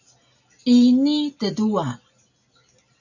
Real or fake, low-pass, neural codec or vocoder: real; 7.2 kHz; none